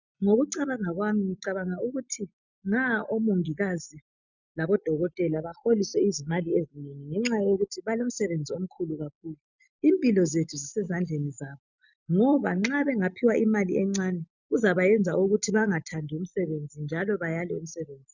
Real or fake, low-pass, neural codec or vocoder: real; 7.2 kHz; none